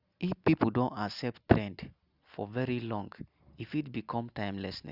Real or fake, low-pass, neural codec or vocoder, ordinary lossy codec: real; 5.4 kHz; none; Opus, 64 kbps